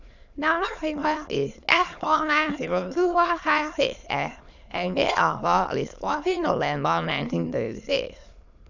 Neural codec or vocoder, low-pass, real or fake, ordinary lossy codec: autoencoder, 22.05 kHz, a latent of 192 numbers a frame, VITS, trained on many speakers; 7.2 kHz; fake; none